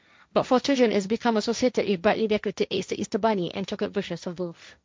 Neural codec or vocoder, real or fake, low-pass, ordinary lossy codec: codec, 16 kHz, 1.1 kbps, Voila-Tokenizer; fake; none; none